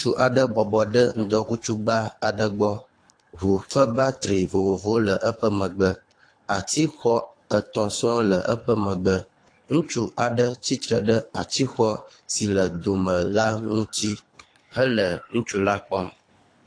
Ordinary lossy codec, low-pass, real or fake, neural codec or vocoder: AAC, 48 kbps; 9.9 kHz; fake; codec, 24 kHz, 3 kbps, HILCodec